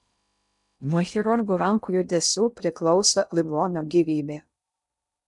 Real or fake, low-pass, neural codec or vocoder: fake; 10.8 kHz; codec, 16 kHz in and 24 kHz out, 0.6 kbps, FocalCodec, streaming, 2048 codes